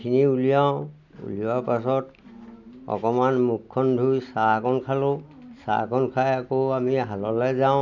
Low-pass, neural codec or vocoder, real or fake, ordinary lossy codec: 7.2 kHz; none; real; none